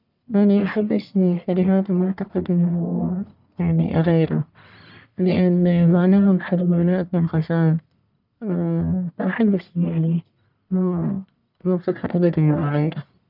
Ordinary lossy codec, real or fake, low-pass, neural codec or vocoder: none; fake; 5.4 kHz; codec, 44.1 kHz, 1.7 kbps, Pupu-Codec